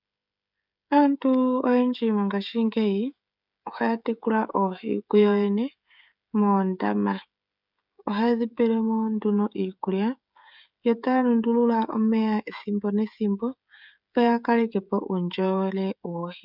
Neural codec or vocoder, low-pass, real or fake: codec, 16 kHz, 16 kbps, FreqCodec, smaller model; 5.4 kHz; fake